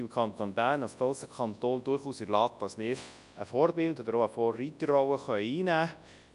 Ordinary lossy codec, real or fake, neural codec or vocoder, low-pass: none; fake; codec, 24 kHz, 0.9 kbps, WavTokenizer, large speech release; 10.8 kHz